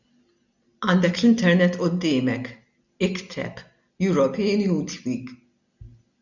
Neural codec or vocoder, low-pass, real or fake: none; 7.2 kHz; real